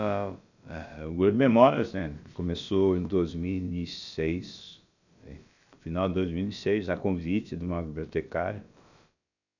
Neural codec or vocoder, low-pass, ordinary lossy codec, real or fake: codec, 16 kHz, about 1 kbps, DyCAST, with the encoder's durations; 7.2 kHz; none; fake